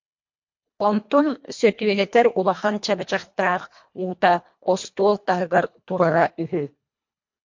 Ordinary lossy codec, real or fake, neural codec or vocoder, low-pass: MP3, 48 kbps; fake; codec, 24 kHz, 1.5 kbps, HILCodec; 7.2 kHz